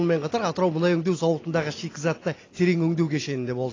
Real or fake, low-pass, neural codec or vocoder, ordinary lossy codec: real; 7.2 kHz; none; AAC, 32 kbps